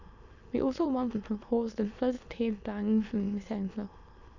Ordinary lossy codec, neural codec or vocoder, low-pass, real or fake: none; autoencoder, 22.05 kHz, a latent of 192 numbers a frame, VITS, trained on many speakers; 7.2 kHz; fake